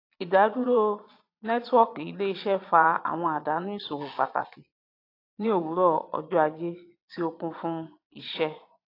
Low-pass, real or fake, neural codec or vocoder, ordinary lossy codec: 5.4 kHz; fake; vocoder, 22.05 kHz, 80 mel bands, Vocos; AAC, 32 kbps